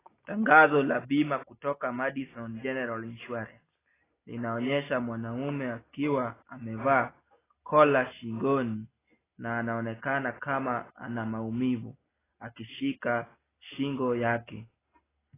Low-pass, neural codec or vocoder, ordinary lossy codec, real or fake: 3.6 kHz; none; AAC, 16 kbps; real